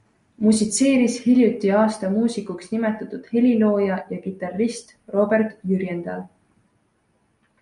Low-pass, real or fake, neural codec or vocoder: 10.8 kHz; real; none